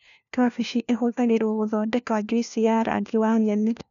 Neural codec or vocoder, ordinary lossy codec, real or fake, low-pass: codec, 16 kHz, 1 kbps, FunCodec, trained on LibriTTS, 50 frames a second; none; fake; 7.2 kHz